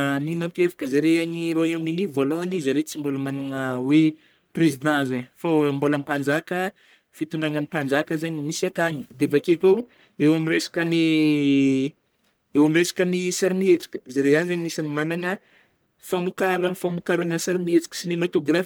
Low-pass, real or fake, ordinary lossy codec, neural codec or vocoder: none; fake; none; codec, 44.1 kHz, 1.7 kbps, Pupu-Codec